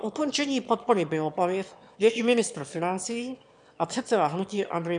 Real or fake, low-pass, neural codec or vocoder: fake; 9.9 kHz; autoencoder, 22.05 kHz, a latent of 192 numbers a frame, VITS, trained on one speaker